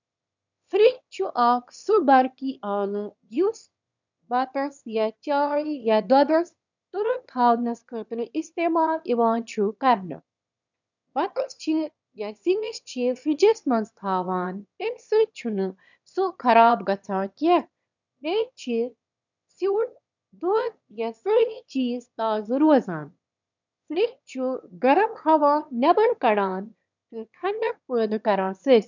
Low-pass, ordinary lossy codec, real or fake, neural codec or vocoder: 7.2 kHz; none; fake; autoencoder, 22.05 kHz, a latent of 192 numbers a frame, VITS, trained on one speaker